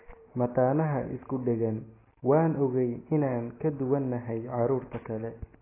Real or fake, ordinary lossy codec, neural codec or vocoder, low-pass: real; AAC, 16 kbps; none; 3.6 kHz